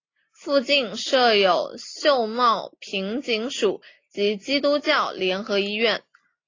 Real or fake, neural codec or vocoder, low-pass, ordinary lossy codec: real; none; 7.2 kHz; AAC, 32 kbps